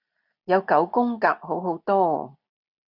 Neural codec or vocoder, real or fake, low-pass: none; real; 5.4 kHz